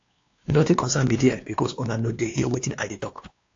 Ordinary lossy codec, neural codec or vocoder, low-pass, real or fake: AAC, 48 kbps; codec, 16 kHz, 2 kbps, X-Codec, WavLM features, trained on Multilingual LibriSpeech; 7.2 kHz; fake